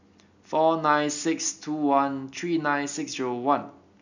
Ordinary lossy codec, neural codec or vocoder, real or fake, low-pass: none; none; real; 7.2 kHz